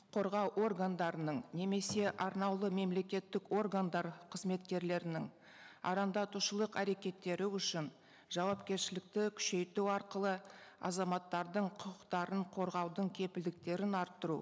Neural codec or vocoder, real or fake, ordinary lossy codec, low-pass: none; real; none; none